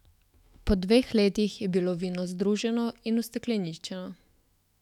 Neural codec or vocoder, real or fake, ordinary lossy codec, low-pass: autoencoder, 48 kHz, 128 numbers a frame, DAC-VAE, trained on Japanese speech; fake; none; 19.8 kHz